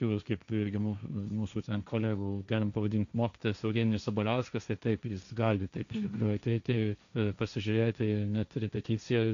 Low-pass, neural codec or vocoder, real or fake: 7.2 kHz; codec, 16 kHz, 1.1 kbps, Voila-Tokenizer; fake